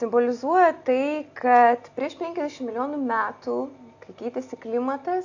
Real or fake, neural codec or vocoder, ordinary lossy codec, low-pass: real; none; AAC, 48 kbps; 7.2 kHz